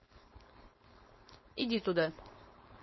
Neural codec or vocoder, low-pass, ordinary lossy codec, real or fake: codec, 16 kHz, 4.8 kbps, FACodec; 7.2 kHz; MP3, 24 kbps; fake